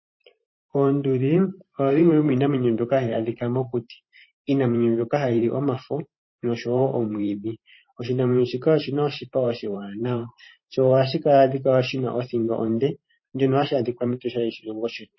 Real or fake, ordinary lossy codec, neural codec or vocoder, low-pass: fake; MP3, 24 kbps; vocoder, 44.1 kHz, 128 mel bands every 512 samples, BigVGAN v2; 7.2 kHz